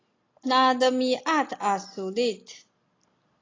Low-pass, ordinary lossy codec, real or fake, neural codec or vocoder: 7.2 kHz; AAC, 32 kbps; real; none